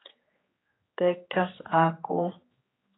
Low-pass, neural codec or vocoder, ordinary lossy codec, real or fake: 7.2 kHz; codec, 16 kHz, 4 kbps, X-Codec, HuBERT features, trained on general audio; AAC, 16 kbps; fake